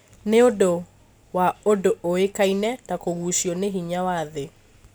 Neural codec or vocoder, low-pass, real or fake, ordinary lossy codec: none; none; real; none